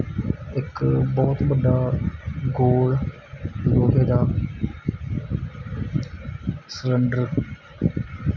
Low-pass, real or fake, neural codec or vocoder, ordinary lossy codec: 7.2 kHz; real; none; none